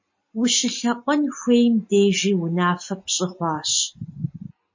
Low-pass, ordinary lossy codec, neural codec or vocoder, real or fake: 7.2 kHz; MP3, 32 kbps; none; real